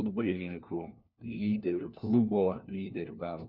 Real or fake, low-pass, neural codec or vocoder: fake; 5.4 kHz; codec, 16 kHz, 1 kbps, FunCodec, trained on LibriTTS, 50 frames a second